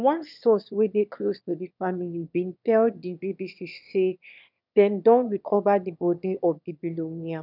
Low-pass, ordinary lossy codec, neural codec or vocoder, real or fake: 5.4 kHz; none; autoencoder, 22.05 kHz, a latent of 192 numbers a frame, VITS, trained on one speaker; fake